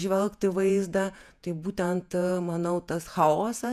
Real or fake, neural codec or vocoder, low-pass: fake; vocoder, 48 kHz, 128 mel bands, Vocos; 14.4 kHz